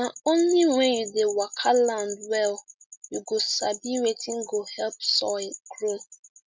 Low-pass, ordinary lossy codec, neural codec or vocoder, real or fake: none; none; none; real